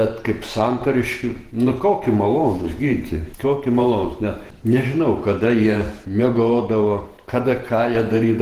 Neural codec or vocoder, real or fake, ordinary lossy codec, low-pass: vocoder, 48 kHz, 128 mel bands, Vocos; fake; Opus, 16 kbps; 14.4 kHz